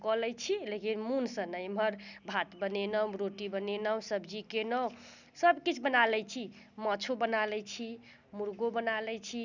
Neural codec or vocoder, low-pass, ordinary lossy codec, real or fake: none; 7.2 kHz; none; real